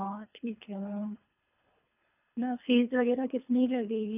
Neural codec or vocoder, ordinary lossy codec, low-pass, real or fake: codec, 24 kHz, 3 kbps, HILCodec; none; 3.6 kHz; fake